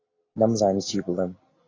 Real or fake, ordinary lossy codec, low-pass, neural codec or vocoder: real; AAC, 32 kbps; 7.2 kHz; none